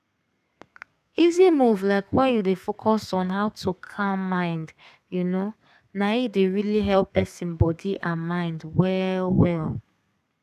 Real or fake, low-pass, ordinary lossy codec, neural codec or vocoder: fake; 14.4 kHz; none; codec, 32 kHz, 1.9 kbps, SNAC